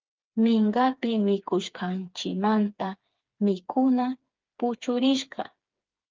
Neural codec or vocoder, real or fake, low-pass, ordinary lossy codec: codec, 16 kHz, 4 kbps, FreqCodec, smaller model; fake; 7.2 kHz; Opus, 32 kbps